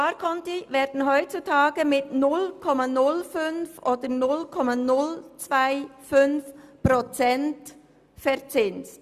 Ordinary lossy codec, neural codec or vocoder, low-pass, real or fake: Opus, 64 kbps; none; 14.4 kHz; real